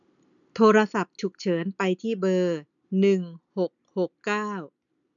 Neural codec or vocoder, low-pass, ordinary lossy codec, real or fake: none; 7.2 kHz; none; real